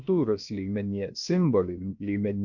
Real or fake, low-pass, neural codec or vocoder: fake; 7.2 kHz; codec, 16 kHz, 0.7 kbps, FocalCodec